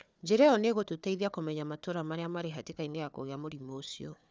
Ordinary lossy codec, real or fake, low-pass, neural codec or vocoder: none; fake; none; codec, 16 kHz, 4 kbps, FunCodec, trained on Chinese and English, 50 frames a second